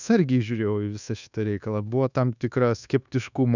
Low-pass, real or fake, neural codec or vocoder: 7.2 kHz; fake; codec, 24 kHz, 1.2 kbps, DualCodec